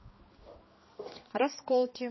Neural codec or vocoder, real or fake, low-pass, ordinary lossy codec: codec, 16 kHz, 2 kbps, X-Codec, HuBERT features, trained on balanced general audio; fake; 7.2 kHz; MP3, 24 kbps